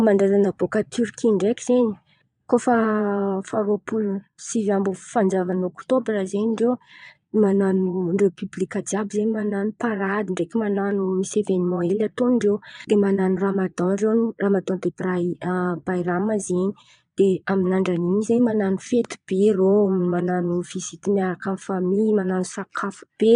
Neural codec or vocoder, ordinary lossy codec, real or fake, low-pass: vocoder, 22.05 kHz, 80 mel bands, Vocos; none; fake; 9.9 kHz